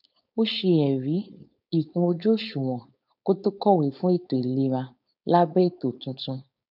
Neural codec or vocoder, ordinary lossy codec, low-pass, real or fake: codec, 16 kHz, 4.8 kbps, FACodec; none; 5.4 kHz; fake